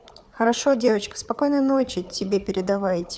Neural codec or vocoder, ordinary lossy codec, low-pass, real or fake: codec, 16 kHz, 4 kbps, FunCodec, trained on Chinese and English, 50 frames a second; none; none; fake